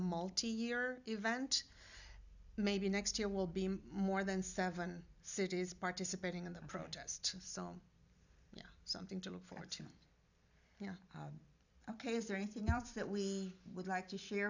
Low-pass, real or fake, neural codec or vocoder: 7.2 kHz; fake; vocoder, 44.1 kHz, 128 mel bands every 256 samples, BigVGAN v2